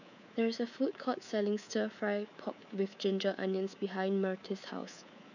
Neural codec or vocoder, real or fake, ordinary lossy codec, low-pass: codec, 24 kHz, 3.1 kbps, DualCodec; fake; none; 7.2 kHz